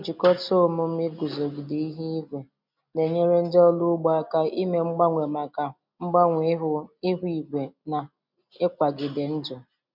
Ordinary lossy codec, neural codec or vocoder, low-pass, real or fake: MP3, 48 kbps; none; 5.4 kHz; real